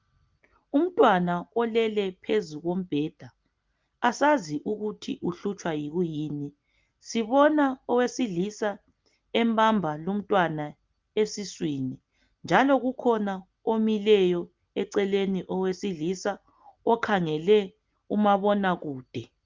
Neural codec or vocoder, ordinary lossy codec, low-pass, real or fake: none; Opus, 32 kbps; 7.2 kHz; real